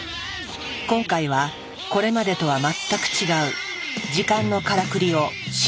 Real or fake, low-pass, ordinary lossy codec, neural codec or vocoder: real; none; none; none